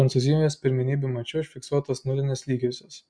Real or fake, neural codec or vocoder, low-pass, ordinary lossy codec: real; none; 9.9 kHz; MP3, 64 kbps